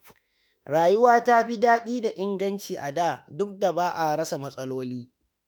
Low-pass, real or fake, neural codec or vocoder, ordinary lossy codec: none; fake; autoencoder, 48 kHz, 32 numbers a frame, DAC-VAE, trained on Japanese speech; none